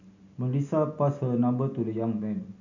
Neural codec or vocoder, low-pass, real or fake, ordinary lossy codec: none; 7.2 kHz; real; none